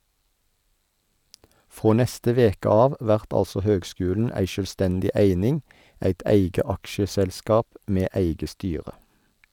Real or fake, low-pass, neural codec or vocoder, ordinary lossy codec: real; 19.8 kHz; none; none